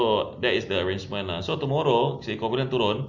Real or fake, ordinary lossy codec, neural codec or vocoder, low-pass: real; MP3, 64 kbps; none; 7.2 kHz